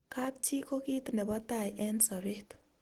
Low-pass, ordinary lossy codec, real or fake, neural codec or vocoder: 19.8 kHz; Opus, 16 kbps; real; none